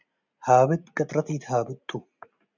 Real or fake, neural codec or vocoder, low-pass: real; none; 7.2 kHz